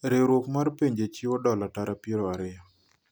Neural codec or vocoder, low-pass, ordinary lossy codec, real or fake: none; none; none; real